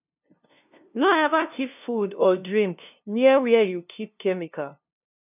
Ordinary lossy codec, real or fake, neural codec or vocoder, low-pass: none; fake; codec, 16 kHz, 0.5 kbps, FunCodec, trained on LibriTTS, 25 frames a second; 3.6 kHz